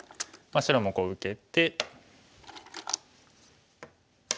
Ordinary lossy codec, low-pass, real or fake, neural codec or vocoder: none; none; real; none